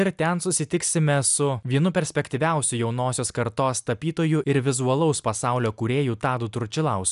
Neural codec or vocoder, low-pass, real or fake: none; 10.8 kHz; real